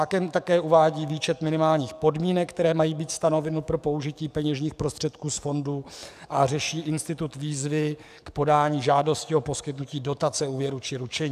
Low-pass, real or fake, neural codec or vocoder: 14.4 kHz; fake; codec, 44.1 kHz, 7.8 kbps, DAC